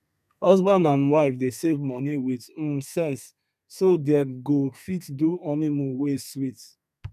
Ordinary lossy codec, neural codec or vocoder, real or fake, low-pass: none; codec, 32 kHz, 1.9 kbps, SNAC; fake; 14.4 kHz